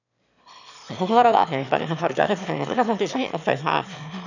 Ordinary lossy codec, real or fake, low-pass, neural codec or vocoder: none; fake; 7.2 kHz; autoencoder, 22.05 kHz, a latent of 192 numbers a frame, VITS, trained on one speaker